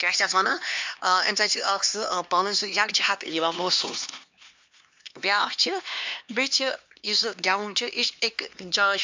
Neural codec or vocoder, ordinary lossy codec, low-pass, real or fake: codec, 16 kHz, 2 kbps, X-Codec, HuBERT features, trained on LibriSpeech; MP3, 64 kbps; 7.2 kHz; fake